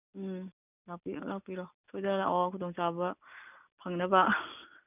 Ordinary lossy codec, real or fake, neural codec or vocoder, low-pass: none; real; none; 3.6 kHz